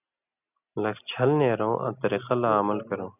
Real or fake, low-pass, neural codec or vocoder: real; 3.6 kHz; none